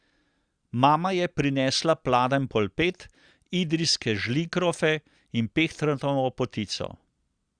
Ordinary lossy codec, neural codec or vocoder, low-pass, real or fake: Opus, 64 kbps; none; 9.9 kHz; real